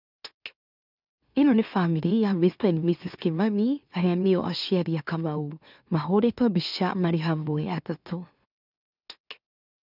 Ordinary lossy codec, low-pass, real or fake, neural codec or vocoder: none; 5.4 kHz; fake; autoencoder, 44.1 kHz, a latent of 192 numbers a frame, MeloTTS